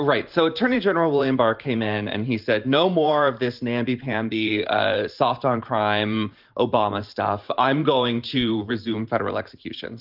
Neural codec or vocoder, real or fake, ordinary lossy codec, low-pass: vocoder, 44.1 kHz, 128 mel bands every 512 samples, BigVGAN v2; fake; Opus, 24 kbps; 5.4 kHz